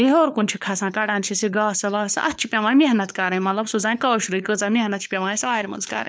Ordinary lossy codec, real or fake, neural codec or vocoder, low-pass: none; fake; codec, 16 kHz, 4 kbps, FunCodec, trained on LibriTTS, 50 frames a second; none